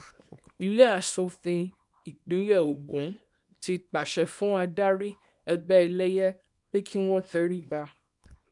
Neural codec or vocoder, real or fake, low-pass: codec, 24 kHz, 0.9 kbps, WavTokenizer, small release; fake; 10.8 kHz